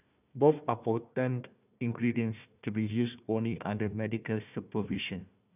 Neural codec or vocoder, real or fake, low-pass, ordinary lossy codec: codec, 16 kHz, 1 kbps, FunCodec, trained on Chinese and English, 50 frames a second; fake; 3.6 kHz; none